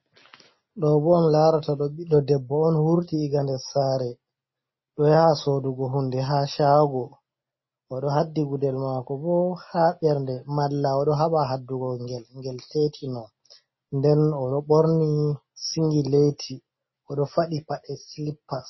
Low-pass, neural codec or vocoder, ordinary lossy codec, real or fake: 7.2 kHz; none; MP3, 24 kbps; real